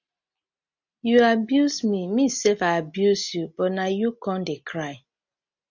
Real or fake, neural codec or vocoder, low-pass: real; none; 7.2 kHz